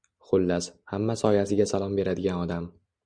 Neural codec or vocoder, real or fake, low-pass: none; real; 9.9 kHz